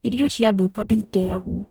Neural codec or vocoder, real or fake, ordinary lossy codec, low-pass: codec, 44.1 kHz, 0.9 kbps, DAC; fake; none; none